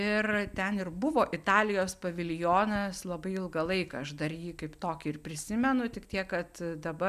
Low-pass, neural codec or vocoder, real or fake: 14.4 kHz; none; real